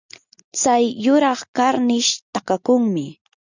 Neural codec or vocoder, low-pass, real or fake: none; 7.2 kHz; real